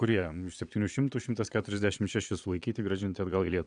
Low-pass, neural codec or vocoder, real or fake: 9.9 kHz; vocoder, 22.05 kHz, 80 mel bands, Vocos; fake